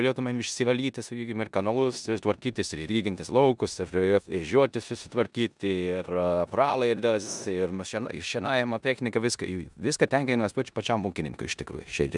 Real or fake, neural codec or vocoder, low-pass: fake; codec, 16 kHz in and 24 kHz out, 0.9 kbps, LongCat-Audio-Codec, four codebook decoder; 10.8 kHz